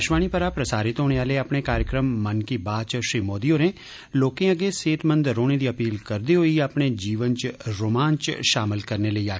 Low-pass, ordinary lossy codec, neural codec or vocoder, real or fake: none; none; none; real